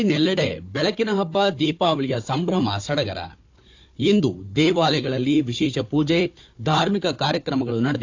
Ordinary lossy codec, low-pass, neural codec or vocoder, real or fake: AAC, 48 kbps; 7.2 kHz; codec, 16 kHz, 4 kbps, FunCodec, trained on Chinese and English, 50 frames a second; fake